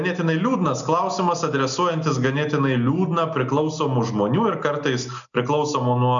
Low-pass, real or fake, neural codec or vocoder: 7.2 kHz; real; none